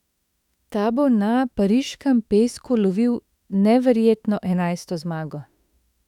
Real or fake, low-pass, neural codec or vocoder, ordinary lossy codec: fake; 19.8 kHz; autoencoder, 48 kHz, 32 numbers a frame, DAC-VAE, trained on Japanese speech; none